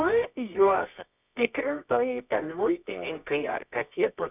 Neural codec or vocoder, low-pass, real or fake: codec, 24 kHz, 0.9 kbps, WavTokenizer, medium music audio release; 3.6 kHz; fake